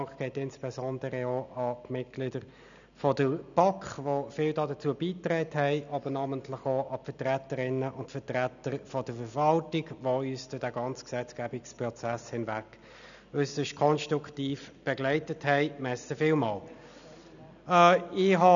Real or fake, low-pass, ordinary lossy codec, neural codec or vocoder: real; 7.2 kHz; none; none